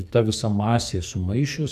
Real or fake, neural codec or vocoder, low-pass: fake; codec, 44.1 kHz, 2.6 kbps, SNAC; 14.4 kHz